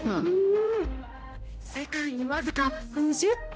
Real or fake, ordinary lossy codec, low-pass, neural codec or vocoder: fake; none; none; codec, 16 kHz, 0.5 kbps, X-Codec, HuBERT features, trained on general audio